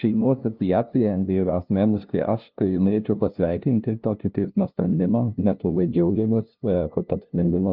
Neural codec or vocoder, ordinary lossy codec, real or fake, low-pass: codec, 16 kHz, 0.5 kbps, FunCodec, trained on LibriTTS, 25 frames a second; Opus, 32 kbps; fake; 5.4 kHz